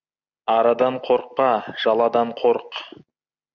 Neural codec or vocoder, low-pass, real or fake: none; 7.2 kHz; real